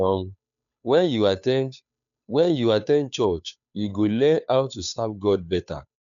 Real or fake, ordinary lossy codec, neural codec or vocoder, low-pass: fake; none; codec, 16 kHz, 2 kbps, FunCodec, trained on Chinese and English, 25 frames a second; 7.2 kHz